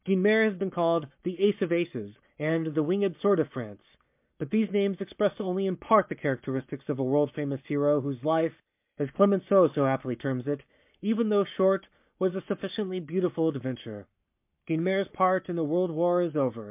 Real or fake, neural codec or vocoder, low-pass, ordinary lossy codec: fake; codec, 44.1 kHz, 7.8 kbps, Pupu-Codec; 3.6 kHz; MP3, 32 kbps